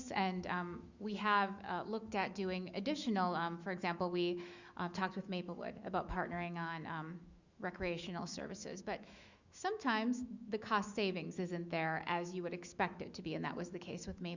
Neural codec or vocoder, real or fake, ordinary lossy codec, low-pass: autoencoder, 48 kHz, 128 numbers a frame, DAC-VAE, trained on Japanese speech; fake; Opus, 64 kbps; 7.2 kHz